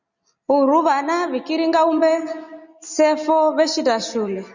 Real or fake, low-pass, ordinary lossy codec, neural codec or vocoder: real; 7.2 kHz; Opus, 64 kbps; none